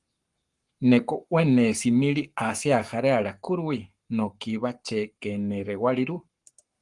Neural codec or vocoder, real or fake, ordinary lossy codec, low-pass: codec, 44.1 kHz, 7.8 kbps, Pupu-Codec; fake; Opus, 24 kbps; 10.8 kHz